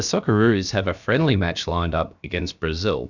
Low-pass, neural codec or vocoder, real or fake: 7.2 kHz; codec, 16 kHz, about 1 kbps, DyCAST, with the encoder's durations; fake